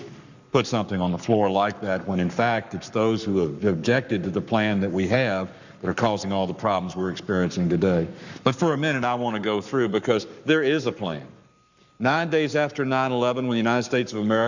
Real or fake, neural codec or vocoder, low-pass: fake; codec, 44.1 kHz, 7.8 kbps, Pupu-Codec; 7.2 kHz